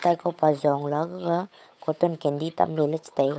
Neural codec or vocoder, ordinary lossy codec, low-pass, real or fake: codec, 16 kHz, 8 kbps, FunCodec, trained on Chinese and English, 25 frames a second; none; none; fake